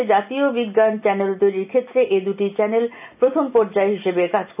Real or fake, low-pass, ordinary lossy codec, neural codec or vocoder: real; 3.6 kHz; none; none